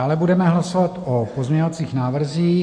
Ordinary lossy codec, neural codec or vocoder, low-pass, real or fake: MP3, 48 kbps; none; 9.9 kHz; real